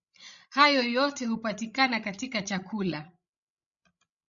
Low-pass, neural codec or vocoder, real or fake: 7.2 kHz; codec, 16 kHz, 16 kbps, FreqCodec, larger model; fake